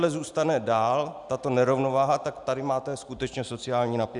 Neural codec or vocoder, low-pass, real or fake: none; 10.8 kHz; real